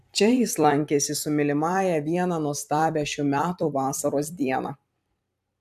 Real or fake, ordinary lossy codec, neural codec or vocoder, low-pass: fake; AAC, 96 kbps; vocoder, 44.1 kHz, 128 mel bands every 256 samples, BigVGAN v2; 14.4 kHz